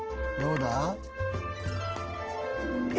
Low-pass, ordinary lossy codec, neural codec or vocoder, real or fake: 7.2 kHz; Opus, 16 kbps; none; real